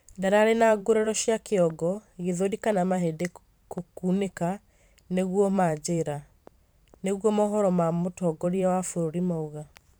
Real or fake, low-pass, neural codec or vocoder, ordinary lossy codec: fake; none; vocoder, 44.1 kHz, 128 mel bands every 512 samples, BigVGAN v2; none